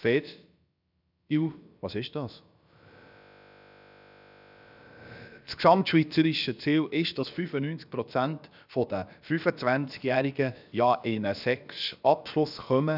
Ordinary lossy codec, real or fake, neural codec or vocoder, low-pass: none; fake; codec, 16 kHz, about 1 kbps, DyCAST, with the encoder's durations; 5.4 kHz